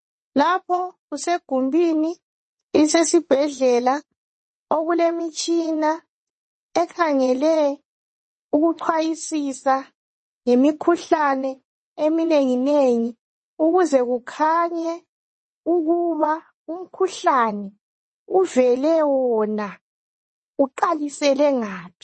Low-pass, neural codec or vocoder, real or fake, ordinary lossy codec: 9.9 kHz; vocoder, 22.05 kHz, 80 mel bands, WaveNeXt; fake; MP3, 32 kbps